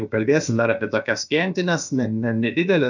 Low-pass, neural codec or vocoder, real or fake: 7.2 kHz; codec, 16 kHz, about 1 kbps, DyCAST, with the encoder's durations; fake